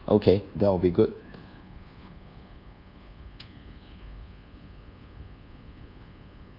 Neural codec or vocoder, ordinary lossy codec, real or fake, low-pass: codec, 24 kHz, 1.2 kbps, DualCodec; none; fake; 5.4 kHz